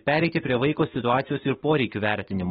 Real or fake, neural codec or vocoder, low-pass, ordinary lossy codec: fake; autoencoder, 48 kHz, 128 numbers a frame, DAC-VAE, trained on Japanese speech; 19.8 kHz; AAC, 16 kbps